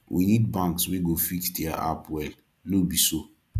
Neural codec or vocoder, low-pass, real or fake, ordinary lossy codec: none; 14.4 kHz; real; none